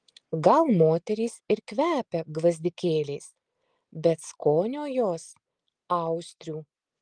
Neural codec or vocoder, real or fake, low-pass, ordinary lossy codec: none; real; 9.9 kHz; Opus, 24 kbps